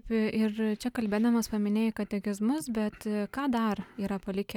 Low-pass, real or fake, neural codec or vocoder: 19.8 kHz; real; none